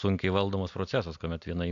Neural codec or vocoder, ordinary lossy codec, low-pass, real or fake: none; AAC, 64 kbps; 7.2 kHz; real